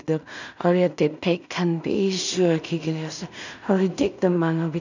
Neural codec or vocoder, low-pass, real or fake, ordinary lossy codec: codec, 16 kHz in and 24 kHz out, 0.4 kbps, LongCat-Audio-Codec, two codebook decoder; 7.2 kHz; fake; none